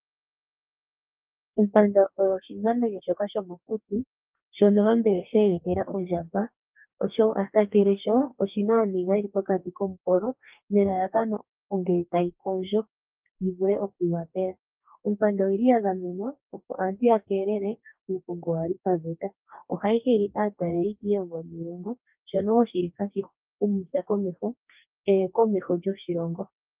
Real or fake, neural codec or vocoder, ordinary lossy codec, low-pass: fake; codec, 44.1 kHz, 2.6 kbps, DAC; Opus, 24 kbps; 3.6 kHz